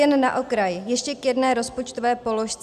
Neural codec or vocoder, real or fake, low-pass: none; real; 14.4 kHz